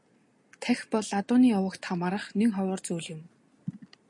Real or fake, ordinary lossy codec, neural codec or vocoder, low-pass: real; MP3, 48 kbps; none; 10.8 kHz